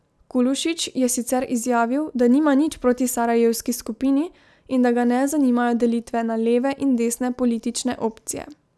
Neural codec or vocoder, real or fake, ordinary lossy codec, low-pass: none; real; none; none